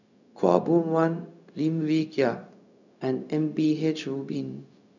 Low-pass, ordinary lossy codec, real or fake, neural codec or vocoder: 7.2 kHz; none; fake; codec, 16 kHz, 0.4 kbps, LongCat-Audio-Codec